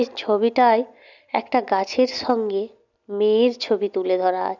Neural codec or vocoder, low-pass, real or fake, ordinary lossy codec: none; 7.2 kHz; real; none